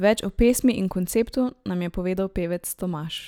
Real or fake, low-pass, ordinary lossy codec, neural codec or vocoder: real; 19.8 kHz; none; none